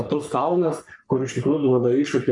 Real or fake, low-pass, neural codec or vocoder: fake; 10.8 kHz; codec, 44.1 kHz, 3.4 kbps, Pupu-Codec